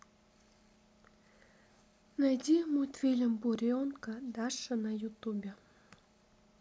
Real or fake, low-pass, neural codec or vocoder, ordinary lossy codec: real; none; none; none